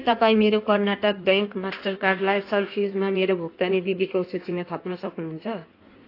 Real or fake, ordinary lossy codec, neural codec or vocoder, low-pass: fake; AAC, 32 kbps; codec, 16 kHz in and 24 kHz out, 1.1 kbps, FireRedTTS-2 codec; 5.4 kHz